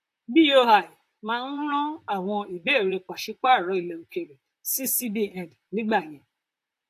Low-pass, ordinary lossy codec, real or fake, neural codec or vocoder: 14.4 kHz; AAC, 64 kbps; fake; vocoder, 44.1 kHz, 128 mel bands, Pupu-Vocoder